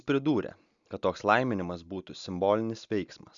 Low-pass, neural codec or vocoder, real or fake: 7.2 kHz; none; real